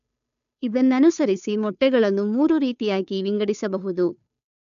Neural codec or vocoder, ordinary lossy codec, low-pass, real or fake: codec, 16 kHz, 2 kbps, FunCodec, trained on Chinese and English, 25 frames a second; none; 7.2 kHz; fake